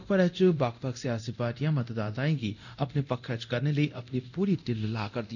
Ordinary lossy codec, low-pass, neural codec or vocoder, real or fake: none; 7.2 kHz; codec, 24 kHz, 0.9 kbps, DualCodec; fake